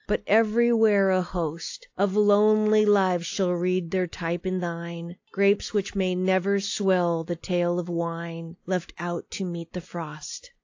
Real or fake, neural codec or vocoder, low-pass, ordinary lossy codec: real; none; 7.2 kHz; AAC, 48 kbps